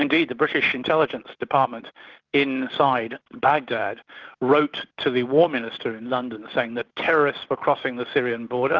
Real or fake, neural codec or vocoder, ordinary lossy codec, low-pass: real; none; Opus, 16 kbps; 7.2 kHz